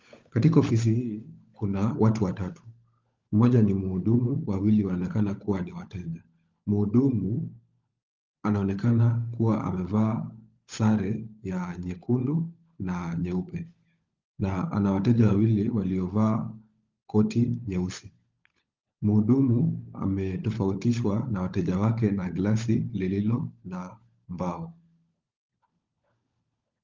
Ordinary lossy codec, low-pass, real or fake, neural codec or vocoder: Opus, 24 kbps; 7.2 kHz; fake; codec, 16 kHz, 16 kbps, FunCodec, trained on LibriTTS, 50 frames a second